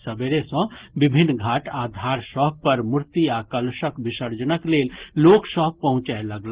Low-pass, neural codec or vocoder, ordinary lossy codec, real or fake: 3.6 kHz; none; Opus, 16 kbps; real